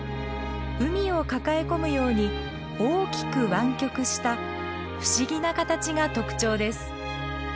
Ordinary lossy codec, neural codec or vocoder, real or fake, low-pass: none; none; real; none